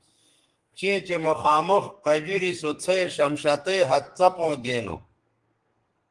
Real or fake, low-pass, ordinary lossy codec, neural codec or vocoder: fake; 10.8 kHz; Opus, 24 kbps; codec, 32 kHz, 1.9 kbps, SNAC